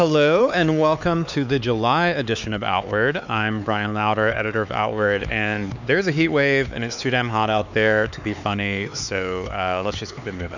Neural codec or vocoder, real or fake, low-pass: codec, 16 kHz, 4 kbps, X-Codec, HuBERT features, trained on LibriSpeech; fake; 7.2 kHz